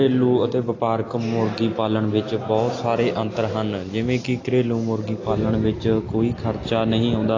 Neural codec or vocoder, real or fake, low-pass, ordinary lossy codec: none; real; 7.2 kHz; AAC, 32 kbps